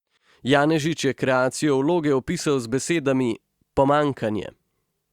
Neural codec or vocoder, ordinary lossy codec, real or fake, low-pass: none; Opus, 64 kbps; real; 19.8 kHz